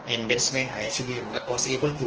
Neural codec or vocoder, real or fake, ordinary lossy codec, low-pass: codec, 44.1 kHz, 2.6 kbps, DAC; fake; Opus, 16 kbps; 7.2 kHz